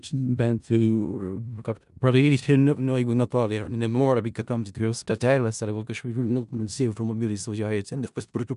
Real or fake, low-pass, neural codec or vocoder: fake; 10.8 kHz; codec, 16 kHz in and 24 kHz out, 0.4 kbps, LongCat-Audio-Codec, four codebook decoder